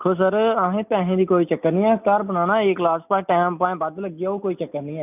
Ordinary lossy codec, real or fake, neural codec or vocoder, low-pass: none; real; none; 3.6 kHz